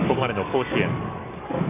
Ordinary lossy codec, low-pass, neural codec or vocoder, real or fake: none; 3.6 kHz; codec, 44.1 kHz, 7.8 kbps, DAC; fake